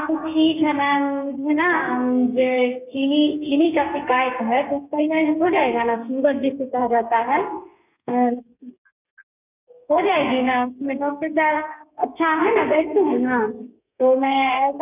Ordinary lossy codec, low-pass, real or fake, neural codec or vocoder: none; 3.6 kHz; fake; codec, 32 kHz, 1.9 kbps, SNAC